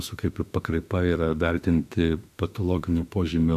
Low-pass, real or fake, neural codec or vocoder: 14.4 kHz; fake; autoencoder, 48 kHz, 32 numbers a frame, DAC-VAE, trained on Japanese speech